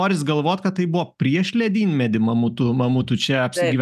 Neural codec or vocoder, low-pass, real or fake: none; 14.4 kHz; real